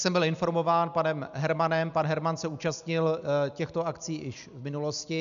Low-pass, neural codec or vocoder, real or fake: 7.2 kHz; none; real